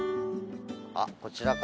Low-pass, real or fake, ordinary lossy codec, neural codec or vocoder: none; real; none; none